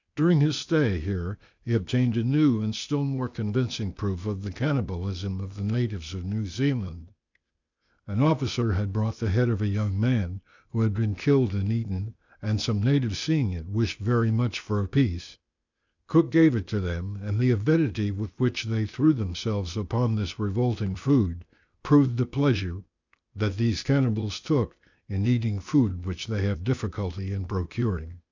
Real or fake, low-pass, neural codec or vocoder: fake; 7.2 kHz; codec, 16 kHz, 0.8 kbps, ZipCodec